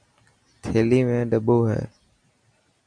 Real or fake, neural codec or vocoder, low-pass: real; none; 9.9 kHz